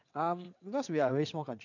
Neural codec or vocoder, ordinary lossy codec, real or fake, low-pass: vocoder, 22.05 kHz, 80 mel bands, WaveNeXt; none; fake; 7.2 kHz